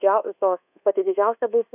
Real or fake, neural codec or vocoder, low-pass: fake; codec, 24 kHz, 1.2 kbps, DualCodec; 3.6 kHz